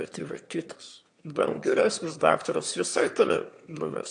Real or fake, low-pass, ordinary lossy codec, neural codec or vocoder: fake; 9.9 kHz; AAC, 64 kbps; autoencoder, 22.05 kHz, a latent of 192 numbers a frame, VITS, trained on one speaker